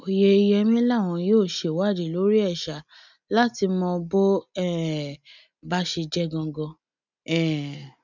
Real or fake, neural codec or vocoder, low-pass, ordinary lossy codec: real; none; 7.2 kHz; none